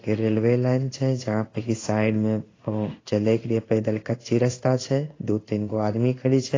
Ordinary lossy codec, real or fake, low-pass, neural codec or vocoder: AAC, 32 kbps; fake; 7.2 kHz; codec, 16 kHz in and 24 kHz out, 1 kbps, XY-Tokenizer